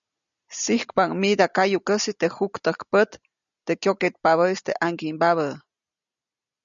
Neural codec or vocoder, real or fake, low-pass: none; real; 7.2 kHz